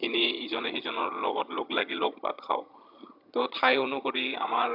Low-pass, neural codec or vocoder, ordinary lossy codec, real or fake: 5.4 kHz; vocoder, 22.05 kHz, 80 mel bands, HiFi-GAN; none; fake